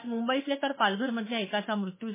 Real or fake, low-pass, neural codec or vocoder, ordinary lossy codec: fake; 3.6 kHz; autoencoder, 48 kHz, 32 numbers a frame, DAC-VAE, trained on Japanese speech; MP3, 16 kbps